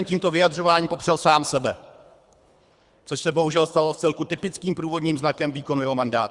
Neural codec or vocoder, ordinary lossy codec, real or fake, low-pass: codec, 24 kHz, 3 kbps, HILCodec; Opus, 64 kbps; fake; 10.8 kHz